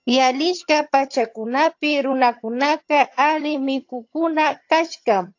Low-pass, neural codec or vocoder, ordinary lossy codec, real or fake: 7.2 kHz; vocoder, 22.05 kHz, 80 mel bands, HiFi-GAN; AAC, 48 kbps; fake